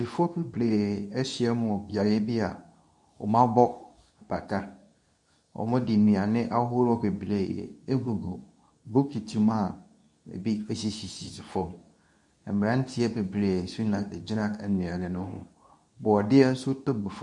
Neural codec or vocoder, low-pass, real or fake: codec, 24 kHz, 0.9 kbps, WavTokenizer, medium speech release version 2; 10.8 kHz; fake